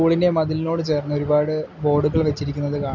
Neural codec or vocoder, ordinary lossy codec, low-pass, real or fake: none; MP3, 64 kbps; 7.2 kHz; real